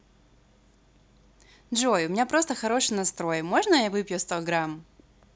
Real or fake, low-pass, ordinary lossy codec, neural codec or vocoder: real; none; none; none